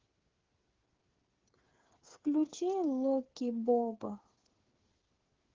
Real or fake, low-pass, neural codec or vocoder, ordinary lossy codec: fake; 7.2 kHz; codec, 16 kHz, 8 kbps, FreqCodec, smaller model; Opus, 16 kbps